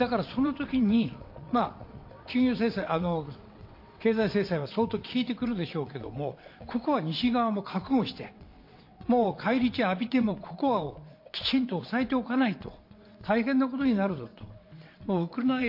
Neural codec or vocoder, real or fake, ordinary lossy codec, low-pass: vocoder, 22.05 kHz, 80 mel bands, WaveNeXt; fake; MP3, 32 kbps; 5.4 kHz